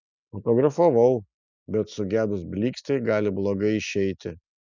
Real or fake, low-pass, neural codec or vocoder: real; 7.2 kHz; none